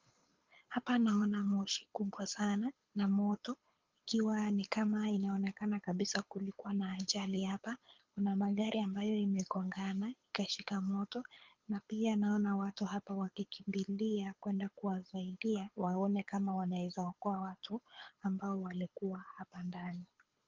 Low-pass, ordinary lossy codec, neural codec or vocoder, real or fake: 7.2 kHz; Opus, 24 kbps; codec, 24 kHz, 6 kbps, HILCodec; fake